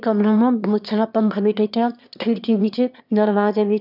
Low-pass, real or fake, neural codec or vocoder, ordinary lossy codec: 5.4 kHz; fake; autoencoder, 22.05 kHz, a latent of 192 numbers a frame, VITS, trained on one speaker; none